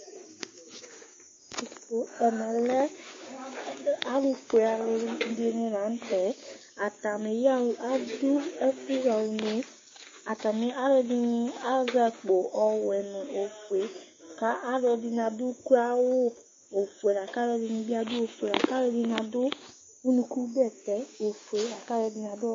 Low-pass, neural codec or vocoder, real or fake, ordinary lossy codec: 7.2 kHz; codec, 16 kHz, 6 kbps, DAC; fake; MP3, 32 kbps